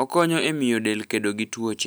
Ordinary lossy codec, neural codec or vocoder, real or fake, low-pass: none; none; real; none